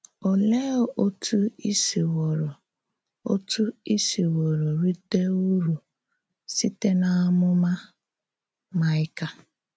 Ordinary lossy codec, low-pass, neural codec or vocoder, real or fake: none; none; none; real